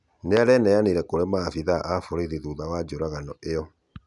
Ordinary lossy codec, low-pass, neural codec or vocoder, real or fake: none; 10.8 kHz; none; real